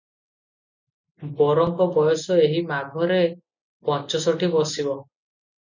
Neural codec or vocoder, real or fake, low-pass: none; real; 7.2 kHz